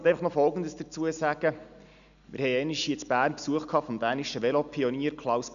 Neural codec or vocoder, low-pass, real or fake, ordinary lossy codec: none; 7.2 kHz; real; none